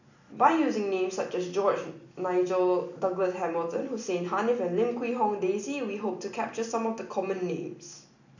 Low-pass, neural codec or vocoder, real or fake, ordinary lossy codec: 7.2 kHz; none; real; none